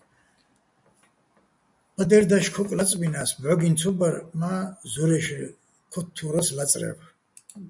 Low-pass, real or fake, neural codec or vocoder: 10.8 kHz; real; none